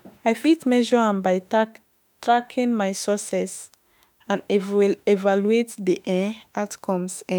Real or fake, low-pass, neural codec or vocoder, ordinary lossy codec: fake; none; autoencoder, 48 kHz, 32 numbers a frame, DAC-VAE, trained on Japanese speech; none